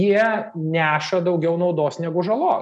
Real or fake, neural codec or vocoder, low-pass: real; none; 10.8 kHz